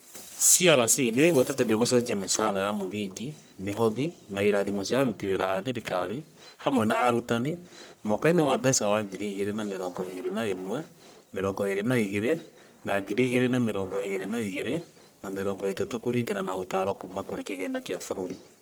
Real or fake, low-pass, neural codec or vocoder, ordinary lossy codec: fake; none; codec, 44.1 kHz, 1.7 kbps, Pupu-Codec; none